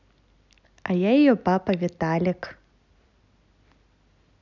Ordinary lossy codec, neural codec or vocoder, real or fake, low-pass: none; none; real; 7.2 kHz